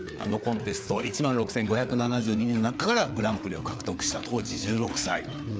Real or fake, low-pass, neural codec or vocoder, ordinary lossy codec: fake; none; codec, 16 kHz, 4 kbps, FreqCodec, larger model; none